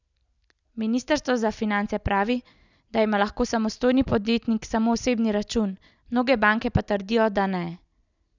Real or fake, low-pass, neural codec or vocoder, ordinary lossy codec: real; 7.2 kHz; none; none